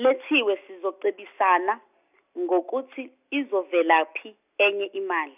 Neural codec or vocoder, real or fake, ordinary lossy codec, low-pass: none; real; none; 3.6 kHz